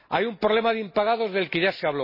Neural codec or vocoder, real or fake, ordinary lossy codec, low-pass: none; real; MP3, 24 kbps; 5.4 kHz